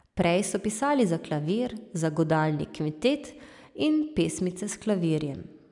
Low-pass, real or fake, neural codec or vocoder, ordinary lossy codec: 10.8 kHz; real; none; none